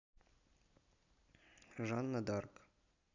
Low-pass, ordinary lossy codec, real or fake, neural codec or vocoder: 7.2 kHz; none; real; none